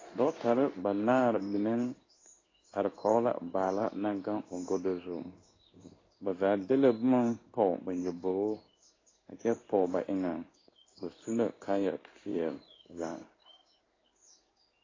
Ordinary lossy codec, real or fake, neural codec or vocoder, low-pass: AAC, 32 kbps; fake; codec, 16 kHz in and 24 kHz out, 1 kbps, XY-Tokenizer; 7.2 kHz